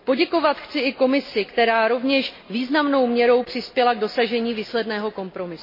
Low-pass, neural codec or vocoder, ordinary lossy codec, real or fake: 5.4 kHz; none; MP3, 24 kbps; real